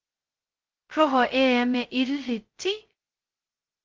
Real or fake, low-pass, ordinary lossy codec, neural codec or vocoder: fake; 7.2 kHz; Opus, 16 kbps; codec, 16 kHz, 0.2 kbps, FocalCodec